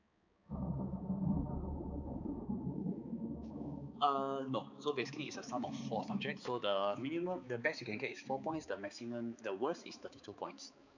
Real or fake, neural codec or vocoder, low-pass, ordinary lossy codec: fake; codec, 16 kHz, 4 kbps, X-Codec, HuBERT features, trained on balanced general audio; 7.2 kHz; none